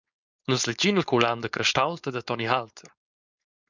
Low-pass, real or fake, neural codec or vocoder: 7.2 kHz; fake; codec, 16 kHz, 4.8 kbps, FACodec